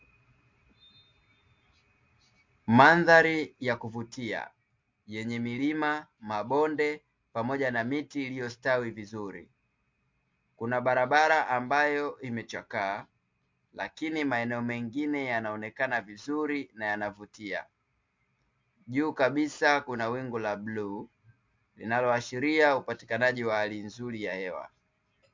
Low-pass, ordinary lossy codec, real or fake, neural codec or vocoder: 7.2 kHz; MP3, 48 kbps; real; none